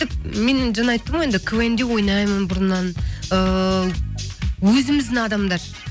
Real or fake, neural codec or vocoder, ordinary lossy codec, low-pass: real; none; none; none